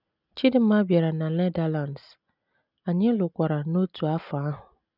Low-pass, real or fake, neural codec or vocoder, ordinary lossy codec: 5.4 kHz; real; none; none